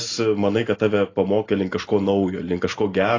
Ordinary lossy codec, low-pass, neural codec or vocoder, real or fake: AAC, 32 kbps; 7.2 kHz; none; real